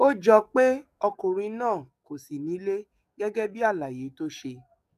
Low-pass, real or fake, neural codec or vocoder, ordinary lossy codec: 14.4 kHz; fake; codec, 44.1 kHz, 7.8 kbps, DAC; none